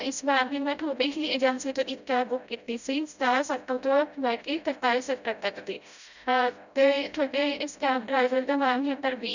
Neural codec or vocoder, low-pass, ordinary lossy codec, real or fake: codec, 16 kHz, 0.5 kbps, FreqCodec, smaller model; 7.2 kHz; none; fake